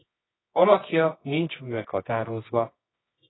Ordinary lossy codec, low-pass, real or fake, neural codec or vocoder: AAC, 16 kbps; 7.2 kHz; fake; codec, 24 kHz, 0.9 kbps, WavTokenizer, medium music audio release